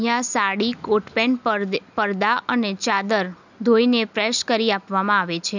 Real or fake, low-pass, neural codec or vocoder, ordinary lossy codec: real; 7.2 kHz; none; none